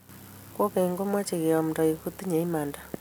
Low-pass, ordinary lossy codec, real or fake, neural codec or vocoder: none; none; real; none